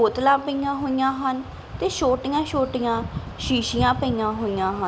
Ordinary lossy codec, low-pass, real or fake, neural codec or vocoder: none; none; real; none